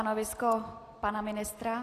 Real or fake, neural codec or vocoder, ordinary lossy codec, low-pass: real; none; AAC, 48 kbps; 14.4 kHz